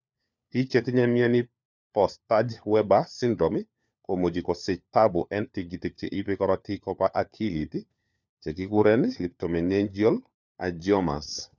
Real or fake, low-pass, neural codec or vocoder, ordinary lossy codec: fake; 7.2 kHz; codec, 16 kHz, 4 kbps, FunCodec, trained on LibriTTS, 50 frames a second; none